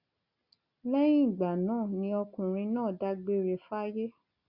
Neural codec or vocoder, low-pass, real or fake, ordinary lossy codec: none; 5.4 kHz; real; Opus, 64 kbps